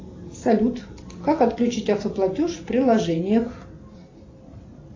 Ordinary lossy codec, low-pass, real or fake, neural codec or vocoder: AAC, 32 kbps; 7.2 kHz; real; none